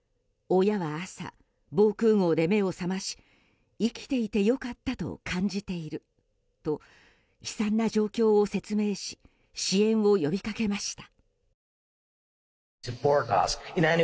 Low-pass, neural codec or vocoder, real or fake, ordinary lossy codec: none; none; real; none